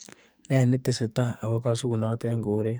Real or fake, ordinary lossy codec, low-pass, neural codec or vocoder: fake; none; none; codec, 44.1 kHz, 2.6 kbps, SNAC